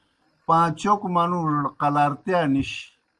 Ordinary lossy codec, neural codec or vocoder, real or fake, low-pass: Opus, 24 kbps; none; real; 10.8 kHz